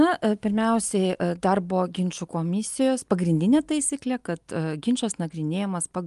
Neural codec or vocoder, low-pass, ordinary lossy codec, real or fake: none; 10.8 kHz; Opus, 32 kbps; real